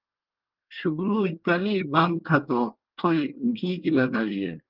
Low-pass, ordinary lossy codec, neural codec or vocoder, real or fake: 5.4 kHz; Opus, 32 kbps; codec, 24 kHz, 1 kbps, SNAC; fake